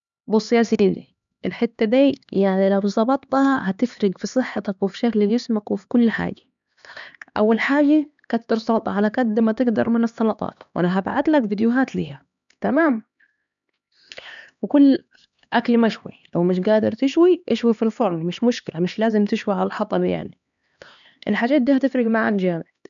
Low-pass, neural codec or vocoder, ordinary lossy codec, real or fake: 7.2 kHz; codec, 16 kHz, 2 kbps, X-Codec, HuBERT features, trained on LibriSpeech; none; fake